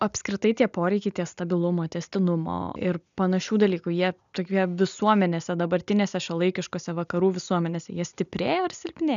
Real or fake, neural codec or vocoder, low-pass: real; none; 7.2 kHz